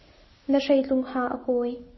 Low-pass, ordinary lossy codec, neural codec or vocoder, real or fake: 7.2 kHz; MP3, 24 kbps; none; real